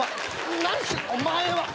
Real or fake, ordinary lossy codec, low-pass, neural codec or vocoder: real; none; none; none